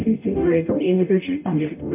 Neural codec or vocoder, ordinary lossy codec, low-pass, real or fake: codec, 44.1 kHz, 0.9 kbps, DAC; MP3, 32 kbps; 3.6 kHz; fake